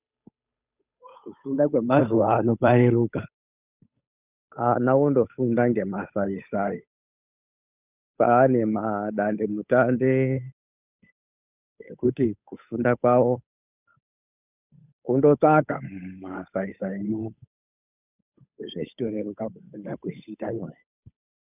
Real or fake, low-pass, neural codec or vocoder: fake; 3.6 kHz; codec, 16 kHz, 8 kbps, FunCodec, trained on Chinese and English, 25 frames a second